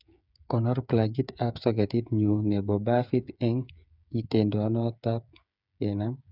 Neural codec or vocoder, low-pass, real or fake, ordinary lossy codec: codec, 16 kHz, 8 kbps, FreqCodec, smaller model; 5.4 kHz; fake; none